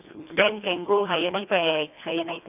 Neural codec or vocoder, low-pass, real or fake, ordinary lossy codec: codec, 24 kHz, 1.5 kbps, HILCodec; 3.6 kHz; fake; none